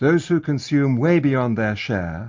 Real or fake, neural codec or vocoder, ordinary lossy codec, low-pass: real; none; MP3, 48 kbps; 7.2 kHz